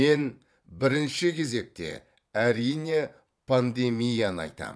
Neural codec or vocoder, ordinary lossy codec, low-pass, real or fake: vocoder, 22.05 kHz, 80 mel bands, Vocos; none; none; fake